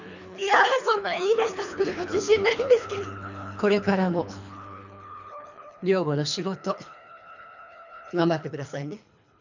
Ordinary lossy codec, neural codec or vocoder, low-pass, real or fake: none; codec, 24 kHz, 3 kbps, HILCodec; 7.2 kHz; fake